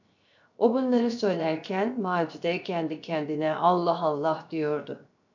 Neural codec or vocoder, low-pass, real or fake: codec, 16 kHz, 0.7 kbps, FocalCodec; 7.2 kHz; fake